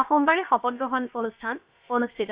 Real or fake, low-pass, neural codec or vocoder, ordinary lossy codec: fake; 3.6 kHz; codec, 16 kHz, about 1 kbps, DyCAST, with the encoder's durations; Opus, 64 kbps